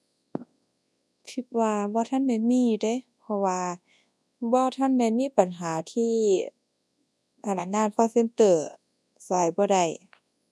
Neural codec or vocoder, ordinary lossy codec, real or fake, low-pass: codec, 24 kHz, 0.9 kbps, WavTokenizer, large speech release; none; fake; none